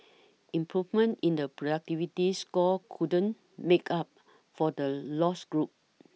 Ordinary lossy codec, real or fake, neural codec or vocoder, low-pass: none; real; none; none